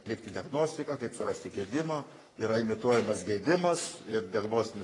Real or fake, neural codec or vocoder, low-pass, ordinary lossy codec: fake; codec, 44.1 kHz, 3.4 kbps, Pupu-Codec; 10.8 kHz; AAC, 32 kbps